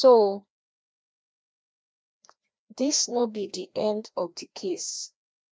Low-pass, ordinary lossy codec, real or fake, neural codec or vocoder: none; none; fake; codec, 16 kHz, 1 kbps, FreqCodec, larger model